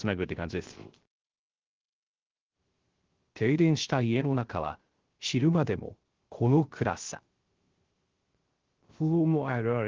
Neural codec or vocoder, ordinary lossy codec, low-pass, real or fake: codec, 16 kHz, 0.3 kbps, FocalCodec; Opus, 16 kbps; 7.2 kHz; fake